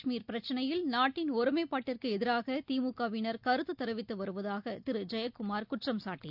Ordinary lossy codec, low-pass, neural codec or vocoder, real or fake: none; 5.4 kHz; none; real